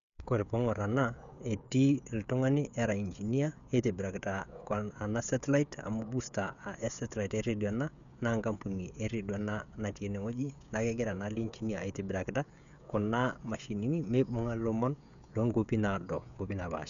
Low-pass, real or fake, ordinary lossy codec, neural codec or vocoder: 7.2 kHz; fake; none; codec, 16 kHz, 16 kbps, FreqCodec, smaller model